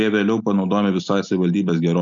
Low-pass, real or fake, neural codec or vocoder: 7.2 kHz; real; none